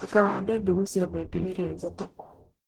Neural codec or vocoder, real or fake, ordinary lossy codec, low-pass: codec, 44.1 kHz, 0.9 kbps, DAC; fake; Opus, 16 kbps; 19.8 kHz